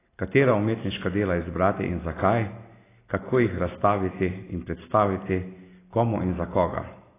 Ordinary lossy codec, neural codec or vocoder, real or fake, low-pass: AAC, 16 kbps; none; real; 3.6 kHz